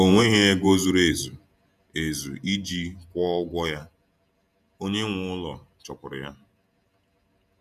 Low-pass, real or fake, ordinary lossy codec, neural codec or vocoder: 14.4 kHz; fake; none; vocoder, 48 kHz, 128 mel bands, Vocos